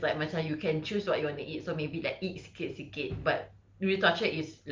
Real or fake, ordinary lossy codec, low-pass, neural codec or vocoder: real; Opus, 24 kbps; 7.2 kHz; none